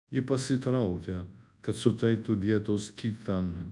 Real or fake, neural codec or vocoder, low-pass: fake; codec, 24 kHz, 0.9 kbps, WavTokenizer, large speech release; 10.8 kHz